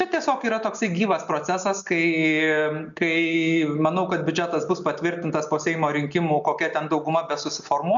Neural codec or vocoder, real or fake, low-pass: none; real; 7.2 kHz